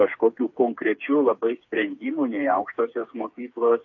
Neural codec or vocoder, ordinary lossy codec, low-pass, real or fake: codec, 16 kHz, 4 kbps, FreqCodec, smaller model; AAC, 48 kbps; 7.2 kHz; fake